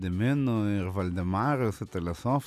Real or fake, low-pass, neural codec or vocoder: real; 14.4 kHz; none